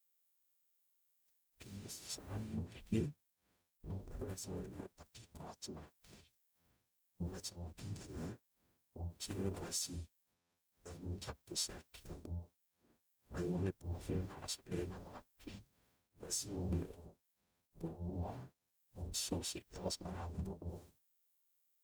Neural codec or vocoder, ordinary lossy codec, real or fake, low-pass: codec, 44.1 kHz, 0.9 kbps, DAC; none; fake; none